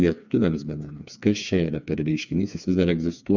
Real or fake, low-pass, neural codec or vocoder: fake; 7.2 kHz; codec, 16 kHz, 4 kbps, FreqCodec, smaller model